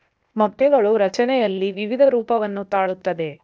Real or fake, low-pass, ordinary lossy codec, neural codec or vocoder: fake; none; none; codec, 16 kHz, 0.8 kbps, ZipCodec